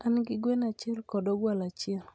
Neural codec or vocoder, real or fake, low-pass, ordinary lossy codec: none; real; none; none